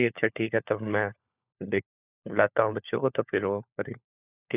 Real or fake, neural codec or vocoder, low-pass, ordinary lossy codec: fake; codec, 16 kHz, 4 kbps, FunCodec, trained on LibriTTS, 50 frames a second; 3.6 kHz; none